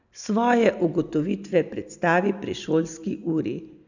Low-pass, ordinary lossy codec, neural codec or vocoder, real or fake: 7.2 kHz; none; none; real